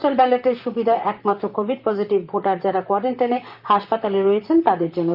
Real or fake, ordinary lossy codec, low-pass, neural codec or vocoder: fake; Opus, 32 kbps; 5.4 kHz; vocoder, 44.1 kHz, 128 mel bands, Pupu-Vocoder